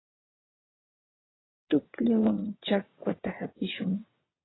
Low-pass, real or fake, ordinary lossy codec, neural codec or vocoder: 7.2 kHz; fake; AAC, 16 kbps; vocoder, 22.05 kHz, 80 mel bands, WaveNeXt